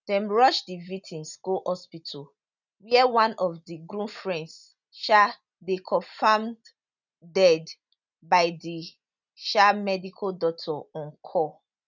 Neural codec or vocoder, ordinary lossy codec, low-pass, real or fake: none; none; 7.2 kHz; real